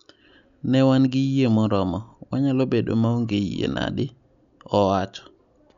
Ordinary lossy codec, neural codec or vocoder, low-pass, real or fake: none; none; 7.2 kHz; real